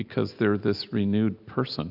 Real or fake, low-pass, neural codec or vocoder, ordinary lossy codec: real; 5.4 kHz; none; AAC, 48 kbps